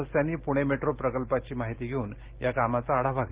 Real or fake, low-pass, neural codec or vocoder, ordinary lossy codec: real; 3.6 kHz; none; Opus, 16 kbps